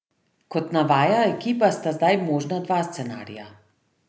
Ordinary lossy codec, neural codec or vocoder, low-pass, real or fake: none; none; none; real